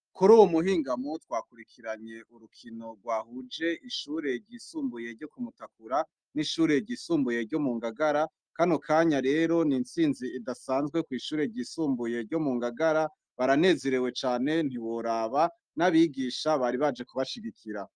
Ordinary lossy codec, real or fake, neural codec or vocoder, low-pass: Opus, 32 kbps; real; none; 9.9 kHz